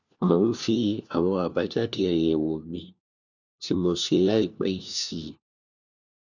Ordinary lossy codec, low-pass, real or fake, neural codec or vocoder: none; 7.2 kHz; fake; codec, 16 kHz, 1 kbps, FunCodec, trained on LibriTTS, 50 frames a second